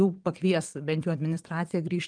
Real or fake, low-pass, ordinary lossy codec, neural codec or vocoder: fake; 9.9 kHz; Opus, 32 kbps; vocoder, 22.05 kHz, 80 mel bands, WaveNeXt